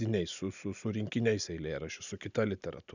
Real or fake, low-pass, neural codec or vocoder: real; 7.2 kHz; none